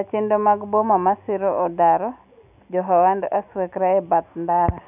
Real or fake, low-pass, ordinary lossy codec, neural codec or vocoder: real; 3.6 kHz; none; none